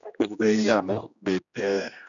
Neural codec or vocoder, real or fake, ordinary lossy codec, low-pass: codec, 16 kHz, 1 kbps, X-Codec, HuBERT features, trained on general audio; fake; none; 7.2 kHz